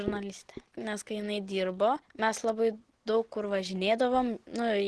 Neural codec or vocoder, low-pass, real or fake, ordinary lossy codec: none; 10.8 kHz; real; Opus, 16 kbps